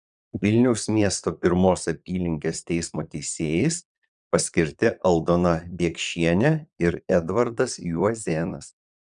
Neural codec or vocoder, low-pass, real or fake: vocoder, 22.05 kHz, 80 mel bands, Vocos; 9.9 kHz; fake